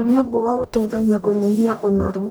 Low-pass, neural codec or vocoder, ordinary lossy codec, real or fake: none; codec, 44.1 kHz, 0.9 kbps, DAC; none; fake